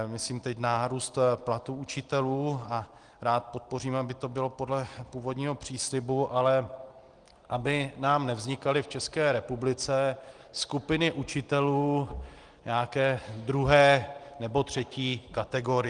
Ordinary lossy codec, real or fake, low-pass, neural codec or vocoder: Opus, 24 kbps; real; 9.9 kHz; none